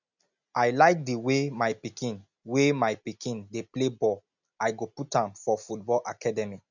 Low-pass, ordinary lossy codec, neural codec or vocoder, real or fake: 7.2 kHz; none; none; real